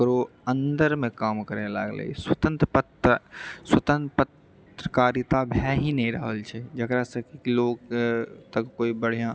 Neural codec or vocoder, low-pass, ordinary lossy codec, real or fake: none; none; none; real